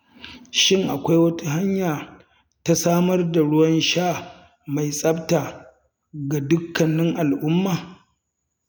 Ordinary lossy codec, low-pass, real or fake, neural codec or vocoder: none; none; real; none